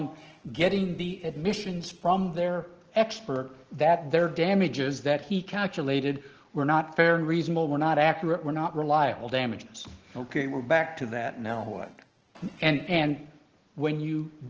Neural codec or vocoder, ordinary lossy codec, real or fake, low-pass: none; Opus, 16 kbps; real; 7.2 kHz